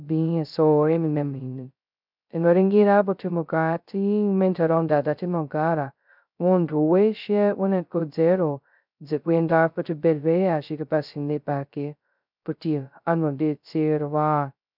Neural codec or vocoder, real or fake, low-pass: codec, 16 kHz, 0.2 kbps, FocalCodec; fake; 5.4 kHz